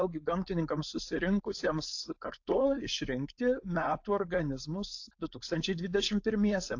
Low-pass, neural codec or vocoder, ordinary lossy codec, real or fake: 7.2 kHz; codec, 16 kHz, 4.8 kbps, FACodec; AAC, 48 kbps; fake